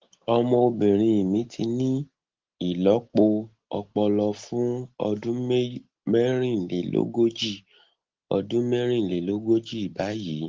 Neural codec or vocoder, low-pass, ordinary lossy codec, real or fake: none; 7.2 kHz; Opus, 16 kbps; real